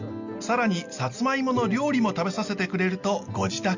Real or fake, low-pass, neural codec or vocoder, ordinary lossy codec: real; 7.2 kHz; none; none